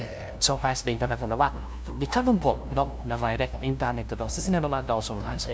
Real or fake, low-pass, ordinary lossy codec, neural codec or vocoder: fake; none; none; codec, 16 kHz, 0.5 kbps, FunCodec, trained on LibriTTS, 25 frames a second